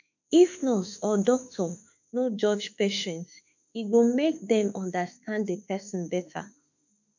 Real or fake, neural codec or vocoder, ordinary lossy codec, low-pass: fake; autoencoder, 48 kHz, 32 numbers a frame, DAC-VAE, trained on Japanese speech; none; 7.2 kHz